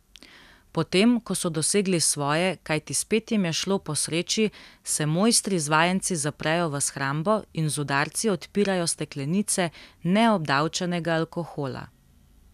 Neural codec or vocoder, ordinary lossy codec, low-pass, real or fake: none; none; 14.4 kHz; real